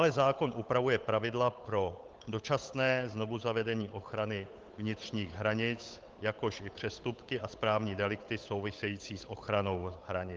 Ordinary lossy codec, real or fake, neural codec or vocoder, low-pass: Opus, 32 kbps; fake; codec, 16 kHz, 8 kbps, FunCodec, trained on Chinese and English, 25 frames a second; 7.2 kHz